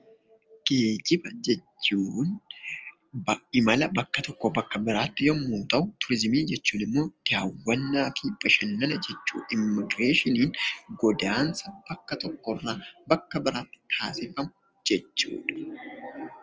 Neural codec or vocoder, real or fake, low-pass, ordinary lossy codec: none; real; 7.2 kHz; Opus, 24 kbps